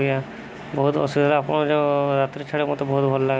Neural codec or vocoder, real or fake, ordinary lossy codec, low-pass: none; real; none; none